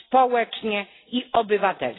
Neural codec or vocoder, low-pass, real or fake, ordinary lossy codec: none; 7.2 kHz; real; AAC, 16 kbps